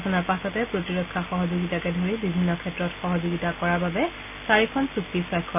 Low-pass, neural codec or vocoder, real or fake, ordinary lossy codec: 3.6 kHz; none; real; none